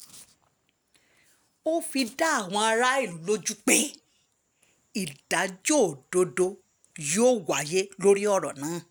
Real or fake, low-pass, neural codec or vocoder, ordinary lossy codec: real; none; none; none